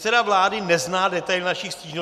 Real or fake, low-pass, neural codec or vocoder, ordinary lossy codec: real; 14.4 kHz; none; MP3, 96 kbps